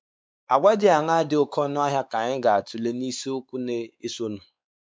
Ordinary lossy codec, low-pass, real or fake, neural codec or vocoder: none; none; fake; codec, 16 kHz, 4 kbps, X-Codec, WavLM features, trained on Multilingual LibriSpeech